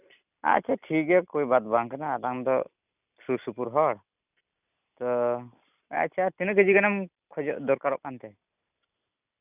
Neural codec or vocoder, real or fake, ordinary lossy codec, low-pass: none; real; none; 3.6 kHz